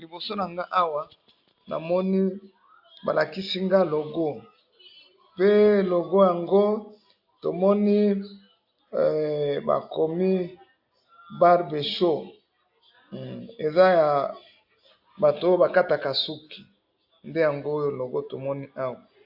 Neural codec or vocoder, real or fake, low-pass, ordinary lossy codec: none; real; 5.4 kHz; AAC, 48 kbps